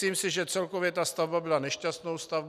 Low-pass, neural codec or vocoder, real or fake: 14.4 kHz; none; real